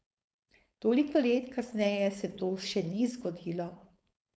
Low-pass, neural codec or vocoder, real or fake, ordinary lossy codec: none; codec, 16 kHz, 4.8 kbps, FACodec; fake; none